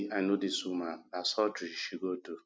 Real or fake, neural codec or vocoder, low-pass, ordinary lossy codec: real; none; none; none